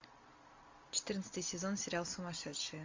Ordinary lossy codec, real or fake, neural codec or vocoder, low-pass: MP3, 48 kbps; real; none; 7.2 kHz